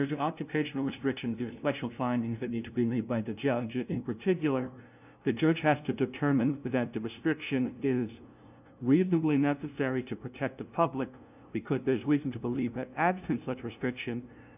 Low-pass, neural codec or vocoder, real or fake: 3.6 kHz; codec, 16 kHz, 0.5 kbps, FunCodec, trained on LibriTTS, 25 frames a second; fake